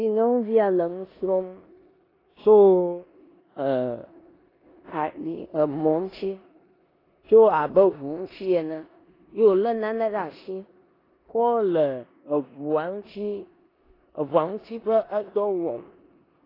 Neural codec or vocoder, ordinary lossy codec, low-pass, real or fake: codec, 16 kHz in and 24 kHz out, 0.9 kbps, LongCat-Audio-Codec, four codebook decoder; AAC, 24 kbps; 5.4 kHz; fake